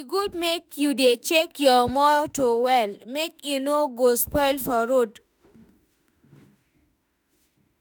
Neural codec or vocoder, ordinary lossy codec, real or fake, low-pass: autoencoder, 48 kHz, 32 numbers a frame, DAC-VAE, trained on Japanese speech; none; fake; none